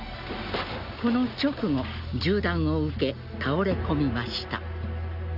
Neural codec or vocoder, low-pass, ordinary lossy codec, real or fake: none; 5.4 kHz; none; real